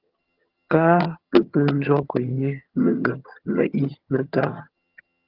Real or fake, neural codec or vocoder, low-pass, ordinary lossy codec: fake; vocoder, 22.05 kHz, 80 mel bands, HiFi-GAN; 5.4 kHz; Opus, 32 kbps